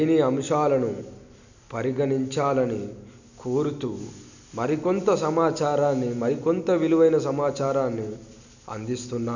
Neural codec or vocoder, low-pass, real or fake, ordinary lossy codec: none; 7.2 kHz; real; none